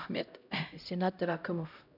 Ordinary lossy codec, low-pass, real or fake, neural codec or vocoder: none; 5.4 kHz; fake; codec, 16 kHz, 0.5 kbps, X-Codec, HuBERT features, trained on LibriSpeech